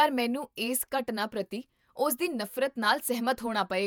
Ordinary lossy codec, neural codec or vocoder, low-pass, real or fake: none; vocoder, 48 kHz, 128 mel bands, Vocos; none; fake